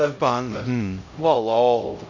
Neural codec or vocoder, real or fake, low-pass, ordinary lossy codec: codec, 16 kHz, 0.5 kbps, X-Codec, HuBERT features, trained on LibriSpeech; fake; 7.2 kHz; none